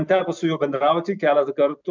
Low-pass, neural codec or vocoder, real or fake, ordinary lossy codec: 7.2 kHz; none; real; MP3, 64 kbps